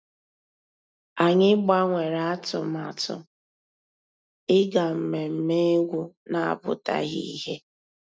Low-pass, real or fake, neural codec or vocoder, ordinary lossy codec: none; real; none; none